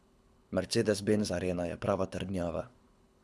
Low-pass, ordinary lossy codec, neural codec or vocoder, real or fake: none; none; codec, 24 kHz, 6 kbps, HILCodec; fake